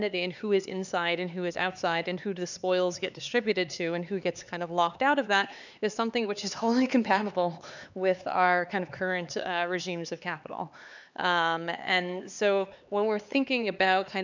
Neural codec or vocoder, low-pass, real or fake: codec, 16 kHz, 4 kbps, X-Codec, HuBERT features, trained on LibriSpeech; 7.2 kHz; fake